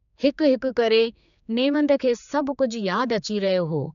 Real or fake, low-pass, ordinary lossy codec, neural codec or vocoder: fake; 7.2 kHz; none; codec, 16 kHz, 4 kbps, X-Codec, HuBERT features, trained on general audio